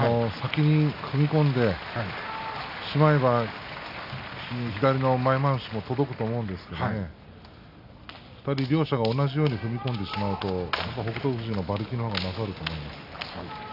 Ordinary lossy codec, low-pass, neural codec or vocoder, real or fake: none; 5.4 kHz; none; real